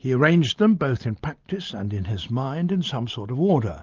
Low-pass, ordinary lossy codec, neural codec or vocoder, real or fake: 7.2 kHz; Opus, 32 kbps; none; real